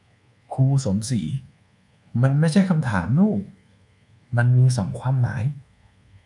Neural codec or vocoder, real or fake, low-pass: codec, 24 kHz, 1.2 kbps, DualCodec; fake; 10.8 kHz